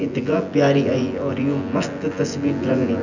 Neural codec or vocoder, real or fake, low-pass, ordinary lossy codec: vocoder, 24 kHz, 100 mel bands, Vocos; fake; 7.2 kHz; none